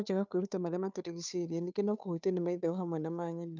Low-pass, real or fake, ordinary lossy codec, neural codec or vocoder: 7.2 kHz; fake; none; codec, 16 kHz, 2 kbps, FunCodec, trained on Chinese and English, 25 frames a second